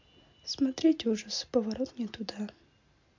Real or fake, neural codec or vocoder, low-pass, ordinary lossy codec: real; none; 7.2 kHz; MP3, 48 kbps